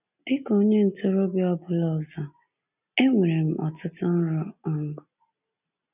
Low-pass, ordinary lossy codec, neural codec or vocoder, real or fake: 3.6 kHz; none; none; real